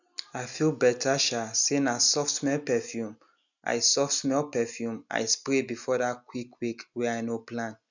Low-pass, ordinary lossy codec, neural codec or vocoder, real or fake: 7.2 kHz; none; none; real